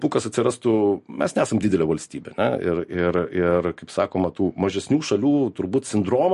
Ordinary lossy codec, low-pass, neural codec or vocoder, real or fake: MP3, 48 kbps; 14.4 kHz; none; real